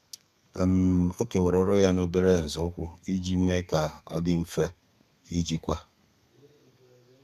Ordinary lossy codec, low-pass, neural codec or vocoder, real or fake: none; 14.4 kHz; codec, 32 kHz, 1.9 kbps, SNAC; fake